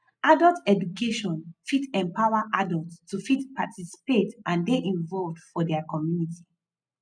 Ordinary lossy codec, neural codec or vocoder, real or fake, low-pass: none; none; real; 9.9 kHz